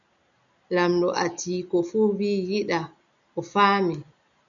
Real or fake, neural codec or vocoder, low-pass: real; none; 7.2 kHz